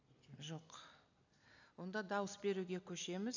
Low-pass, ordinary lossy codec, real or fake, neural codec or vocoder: 7.2 kHz; none; real; none